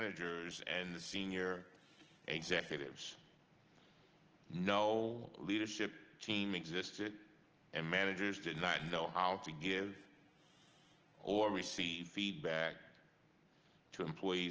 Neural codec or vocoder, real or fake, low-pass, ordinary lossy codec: none; real; 7.2 kHz; Opus, 16 kbps